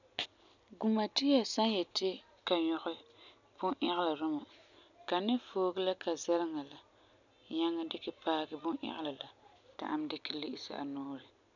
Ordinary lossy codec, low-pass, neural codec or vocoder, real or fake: none; 7.2 kHz; none; real